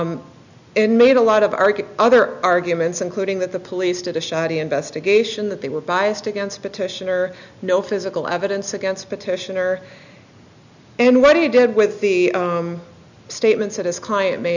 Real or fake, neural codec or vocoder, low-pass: real; none; 7.2 kHz